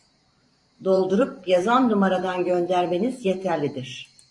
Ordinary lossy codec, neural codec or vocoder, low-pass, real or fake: AAC, 64 kbps; vocoder, 44.1 kHz, 128 mel bands every 512 samples, BigVGAN v2; 10.8 kHz; fake